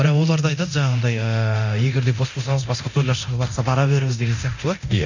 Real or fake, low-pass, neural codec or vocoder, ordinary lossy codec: fake; 7.2 kHz; codec, 24 kHz, 0.9 kbps, DualCodec; none